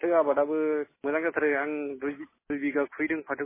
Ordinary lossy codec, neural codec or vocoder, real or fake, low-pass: MP3, 16 kbps; none; real; 3.6 kHz